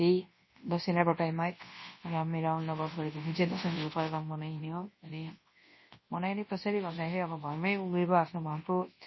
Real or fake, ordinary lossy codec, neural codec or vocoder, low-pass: fake; MP3, 24 kbps; codec, 24 kHz, 0.9 kbps, WavTokenizer, large speech release; 7.2 kHz